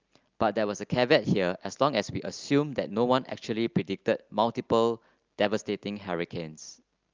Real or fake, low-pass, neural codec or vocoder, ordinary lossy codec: real; 7.2 kHz; none; Opus, 24 kbps